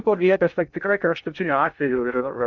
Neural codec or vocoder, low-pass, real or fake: codec, 16 kHz in and 24 kHz out, 0.6 kbps, FocalCodec, streaming, 2048 codes; 7.2 kHz; fake